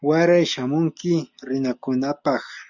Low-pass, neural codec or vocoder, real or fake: 7.2 kHz; none; real